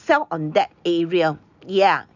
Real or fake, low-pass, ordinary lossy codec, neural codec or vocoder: real; 7.2 kHz; none; none